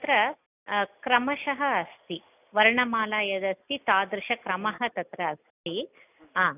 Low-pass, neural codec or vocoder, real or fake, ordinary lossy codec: 3.6 kHz; none; real; none